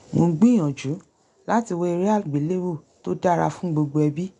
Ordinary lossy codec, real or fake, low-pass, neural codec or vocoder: none; real; 10.8 kHz; none